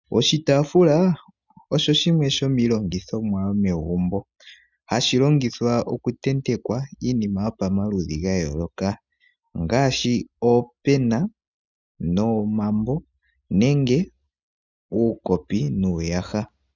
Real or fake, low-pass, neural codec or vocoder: real; 7.2 kHz; none